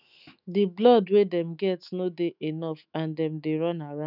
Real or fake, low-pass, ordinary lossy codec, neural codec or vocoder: fake; 5.4 kHz; none; autoencoder, 48 kHz, 128 numbers a frame, DAC-VAE, trained on Japanese speech